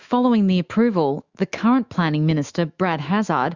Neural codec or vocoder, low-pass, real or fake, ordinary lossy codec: autoencoder, 48 kHz, 128 numbers a frame, DAC-VAE, trained on Japanese speech; 7.2 kHz; fake; Opus, 64 kbps